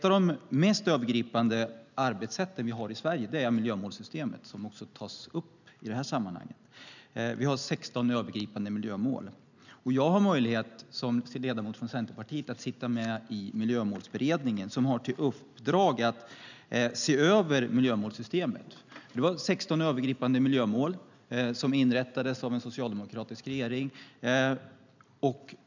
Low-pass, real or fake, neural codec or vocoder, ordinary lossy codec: 7.2 kHz; real; none; none